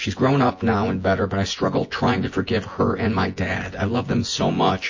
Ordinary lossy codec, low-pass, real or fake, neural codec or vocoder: MP3, 32 kbps; 7.2 kHz; fake; vocoder, 24 kHz, 100 mel bands, Vocos